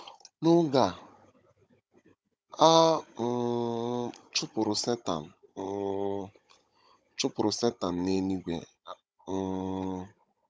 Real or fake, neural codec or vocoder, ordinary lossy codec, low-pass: fake; codec, 16 kHz, 16 kbps, FunCodec, trained on Chinese and English, 50 frames a second; none; none